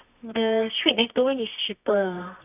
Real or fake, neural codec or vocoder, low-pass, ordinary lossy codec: fake; codec, 24 kHz, 0.9 kbps, WavTokenizer, medium music audio release; 3.6 kHz; none